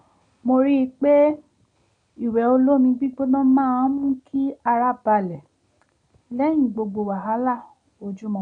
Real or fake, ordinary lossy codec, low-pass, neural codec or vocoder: real; none; 9.9 kHz; none